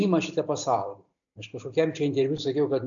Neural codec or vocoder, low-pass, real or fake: none; 7.2 kHz; real